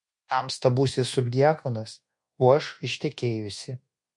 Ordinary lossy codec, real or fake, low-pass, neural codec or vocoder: MP3, 48 kbps; fake; 10.8 kHz; codec, 24 kHz, 1.2 kbps, DualCodec